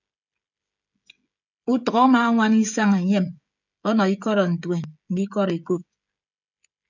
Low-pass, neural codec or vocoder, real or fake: 7.2 kHz; codec, 16 kHz, 16 kbps, FreqCodec, smaller model; fake